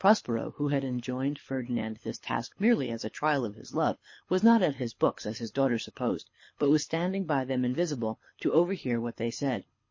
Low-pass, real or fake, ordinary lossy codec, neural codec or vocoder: 7.2 kHz; fake; MP3, 32 kbps; codec, 24 kHz, 6 kbps, HILCodec